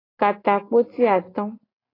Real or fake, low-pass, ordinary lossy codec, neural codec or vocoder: real; 5.4 kHz; AAC, 24 kbps; none